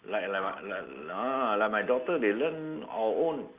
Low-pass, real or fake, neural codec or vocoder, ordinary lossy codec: 3.6 kHz; real; none; Opus, 32 kbps